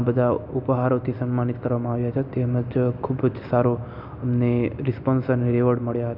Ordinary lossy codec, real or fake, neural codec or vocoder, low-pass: none; real; none; 5.4 kHz